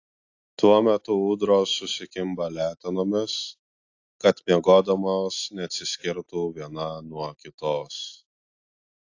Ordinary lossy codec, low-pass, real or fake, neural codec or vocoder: AAC, 48 kbps; 7.2 kHz; real; none